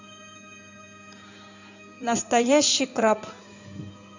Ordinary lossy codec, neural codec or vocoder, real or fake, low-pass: none; codec, 16 kHz in and 24 kHz out, 2.2 kbps, FireRedTTS-2 codec; fake; 7.2 kHz